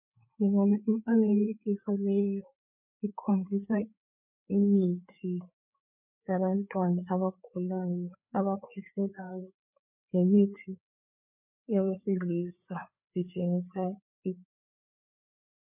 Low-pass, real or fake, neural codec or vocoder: 3.6 kHz; fake; codec, 16 kHz, 4 kbps, FreqCodec, larger model